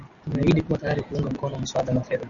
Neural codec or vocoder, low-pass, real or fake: none; 7.2 kHz; real